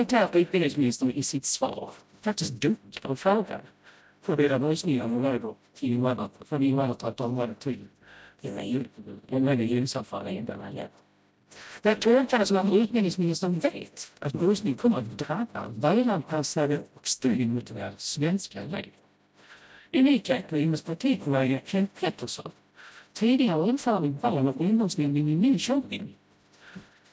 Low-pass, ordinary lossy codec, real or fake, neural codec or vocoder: none; none; fake; codec, 16 kHz, 0.5 kbps, FreqCodec, smaller model